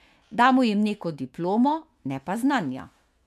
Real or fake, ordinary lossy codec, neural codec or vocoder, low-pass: fake; MP3, 96 kbps; autoencoder, 48 kHz, 128 numbers a frame, DAC-VAE, trained on Japanese speech; 14.4 kHz